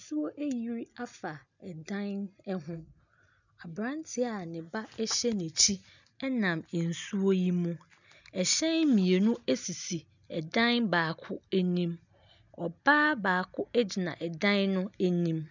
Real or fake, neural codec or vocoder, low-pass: real; none; 7.2 kHz